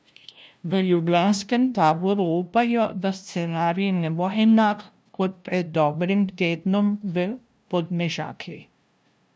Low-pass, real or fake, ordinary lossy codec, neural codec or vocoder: none; fake; none; codec, 16 kHz, 0.5 kbps, FunCodec, trained on LibriTTS, 25 frames a second